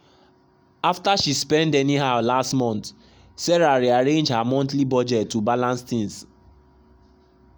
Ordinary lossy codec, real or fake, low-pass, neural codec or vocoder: none; real; none; none